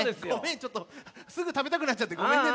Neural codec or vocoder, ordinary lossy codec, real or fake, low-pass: none; none; real; none